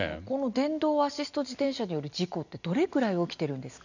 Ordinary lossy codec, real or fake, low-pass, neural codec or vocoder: none; real; 7.2 kHz; none